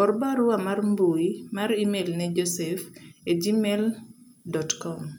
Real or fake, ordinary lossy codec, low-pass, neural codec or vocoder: real; none; none; none